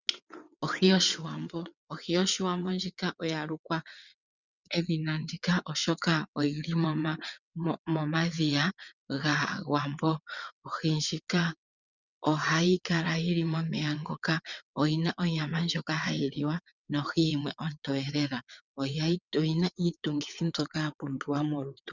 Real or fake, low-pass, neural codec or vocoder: fake; 7.2 kHz; vocoder, 22.05 kHz, 80 mel bands, WaveNeXt